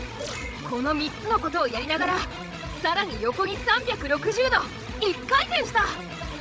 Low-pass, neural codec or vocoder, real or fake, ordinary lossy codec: none; codec, 16 kHz, 16 kbps, FreqCodec, larger model; fake; none